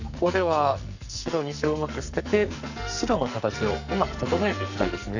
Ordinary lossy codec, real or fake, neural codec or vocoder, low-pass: none; fake; codec, 44.1 kHz, 2.6 kbps, SNAC; 7.2 kHz